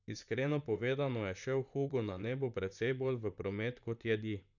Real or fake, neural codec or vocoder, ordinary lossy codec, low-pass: fake; vocoder, 44.1 kHz, 128 mel bands, Pupu-Vocoder; none; 7.2 kHz